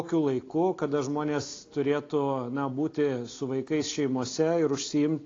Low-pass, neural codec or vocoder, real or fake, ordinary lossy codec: 7.2 kHz; none; real; AAC, 32 kbps